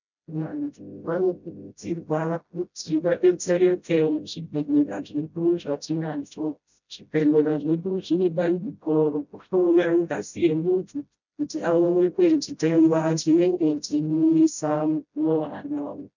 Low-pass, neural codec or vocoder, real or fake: 7.2 kHz; codec, 16 kHz, 0.5 kbps, FreqCodec, smaller model; fake